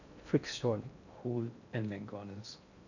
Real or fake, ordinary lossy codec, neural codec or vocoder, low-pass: fake; none; codec, 16 kHz in and 24 kHz out, 0.6 kbps, FocalCodec, streaming, 2048 codes; 7.2 kHz